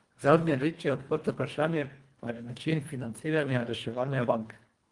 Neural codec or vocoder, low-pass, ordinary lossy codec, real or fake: codec, 24 kHz, 1.5 kbps, HILCodec; 10.8 kHz; Opus, 32 kbps; fake